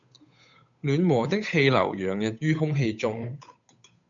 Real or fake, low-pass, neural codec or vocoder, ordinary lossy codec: fake; 7.2 kHz; codec, 16 kHz, 8 kbps, FunCodec, trained on Chinese and English, 25 frames a second; MP3, 64 kbps